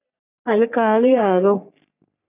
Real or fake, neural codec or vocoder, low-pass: fake; codec, 44.1 kHz, 3.4 kbps, Pupu-Codec; 3.6 kHz